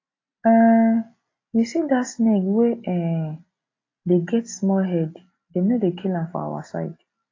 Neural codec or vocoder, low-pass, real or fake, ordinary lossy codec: none; 7.2 kHz; real; AAC, 32 kbps